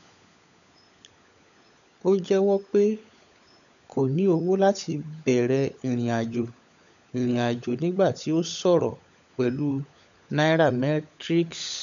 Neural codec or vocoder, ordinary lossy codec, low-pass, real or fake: codec, 16 kHz, 16 kbps, FunCodec, trained on LibriTTS, 50 frames a second; none; 7.2 kHz; fake